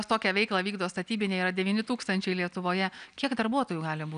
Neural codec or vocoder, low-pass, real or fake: none; 9.9 kHz; real